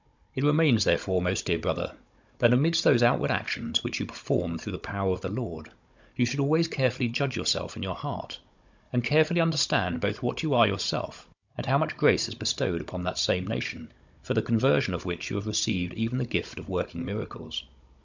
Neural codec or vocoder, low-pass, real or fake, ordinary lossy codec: codec, 16 kHz, 16 kbps, FunCodec, trained on Chinese and English, 50 frames a second; 7.2 kHz; fake; MP3, 64 kbps